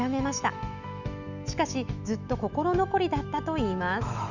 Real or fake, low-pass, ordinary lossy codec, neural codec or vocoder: fake; 7.2 kHz; none; autoencoder, 48 kHz, 128 numbers a frame, DAC-VAE, trained on Japanese speech